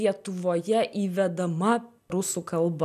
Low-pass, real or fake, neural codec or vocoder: 14.4 kHz; real; none